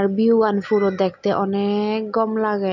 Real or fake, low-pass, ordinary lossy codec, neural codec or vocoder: real; 7.2 kHz; none; none